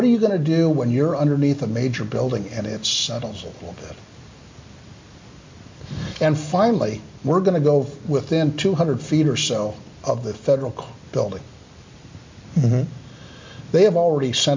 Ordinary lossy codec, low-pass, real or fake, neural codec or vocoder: MP3, 48 kbps; 7.2 kHz; real; none